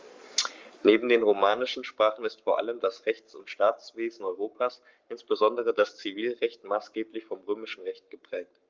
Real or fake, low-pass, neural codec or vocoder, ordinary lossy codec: fake; 7.2 kHz; codec, 44.1 kHz, 7.8 kbps, Pupu-Codec; Opus, 32 kbps